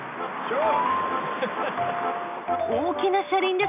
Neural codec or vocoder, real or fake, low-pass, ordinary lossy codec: none; real; 3.6 kHz; none